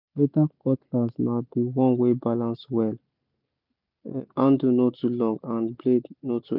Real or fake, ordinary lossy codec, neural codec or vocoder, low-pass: real; none; none; 5.4 kHz